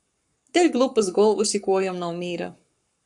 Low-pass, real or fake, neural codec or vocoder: 10.8 kHz; fake; codec, 44.1 kHz, 7.8 kbps, Pupu-Codec